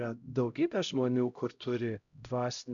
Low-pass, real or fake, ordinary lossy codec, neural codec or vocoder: 7.2 kHz; fake; MP3, 96 kbps; codec, 16 kHz, 0.5 kbps, X-Codec, HuBERT features, trained on LibriSpeech